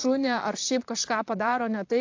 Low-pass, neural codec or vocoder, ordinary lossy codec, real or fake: 7.2 kHz; vocoder, 44.1 kHz, 128 mel bands, Pupu-Vocoder; AAC, 48 kbps; fake